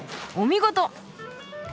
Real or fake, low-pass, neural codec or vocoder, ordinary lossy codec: real; none; none; none